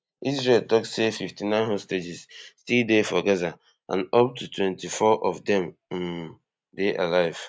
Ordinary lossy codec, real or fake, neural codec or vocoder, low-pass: none; real; none; none